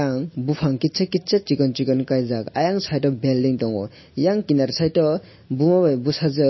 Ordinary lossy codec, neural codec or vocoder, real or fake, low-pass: MP3, 24 kbps; none; real; 7.2 kHz